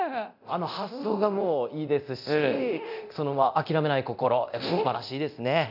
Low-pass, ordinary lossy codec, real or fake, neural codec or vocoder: 5.4 kHz; none; fake; codec, 24 kHz, 0.9 kbps, DualCodec